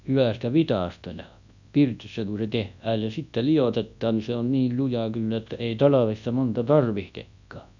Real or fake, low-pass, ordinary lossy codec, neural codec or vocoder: fake; 7.2 kHz; none; codec, 24 kHz, 0.9 kbps, WavTokenizer, large speech release